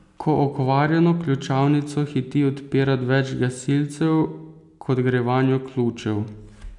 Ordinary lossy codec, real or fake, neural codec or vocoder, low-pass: none; real; none; 10.8 kHz